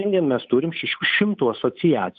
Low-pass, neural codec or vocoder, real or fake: 7.2 kHz; codec, 16 kHz, 8 kbps, FunCodec, trained on Chinese and English, 25 frames a second; fake